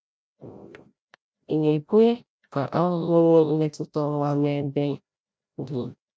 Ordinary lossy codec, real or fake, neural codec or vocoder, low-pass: none; fake; codec, 16 kHz, 0.5 kbps, FreqCodec, larger model; none